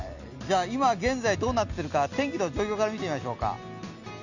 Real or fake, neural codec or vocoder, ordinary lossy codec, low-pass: real; none; none; 7.2 kHz